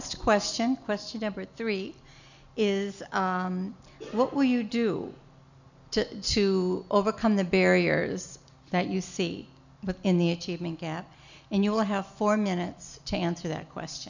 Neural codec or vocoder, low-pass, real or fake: none; 7.2 kHz; real